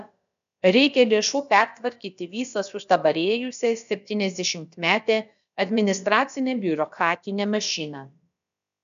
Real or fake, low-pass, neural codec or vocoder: fake; 7.2 kHz; codec, 16 kHz, about 1 kbps, DyCAST, with the encoder's durations